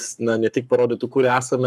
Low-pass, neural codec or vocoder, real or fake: 14.4 kHz; codec, 44.1 kHz, 7.8 kbps, Pupu-Codec; fake